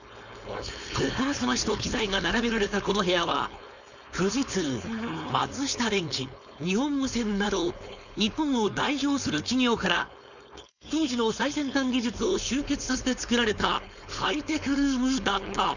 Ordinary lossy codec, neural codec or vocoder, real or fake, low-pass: none; codec, 16 kHz, 4.8 kbps, FACodec; fake; 7.2 kHz